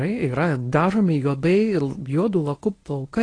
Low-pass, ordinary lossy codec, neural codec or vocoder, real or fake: 9.9 kHz; AAC, 32 kbps; codec, 24 kHz, 0.9 kbps, WavTokenizer, medium speech release version 1; fake